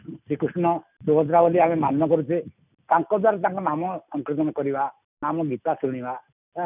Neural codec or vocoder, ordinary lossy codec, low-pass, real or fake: none; none; 3.6 kHz; real